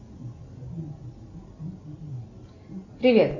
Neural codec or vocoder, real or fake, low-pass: none; real; 7.2 kHz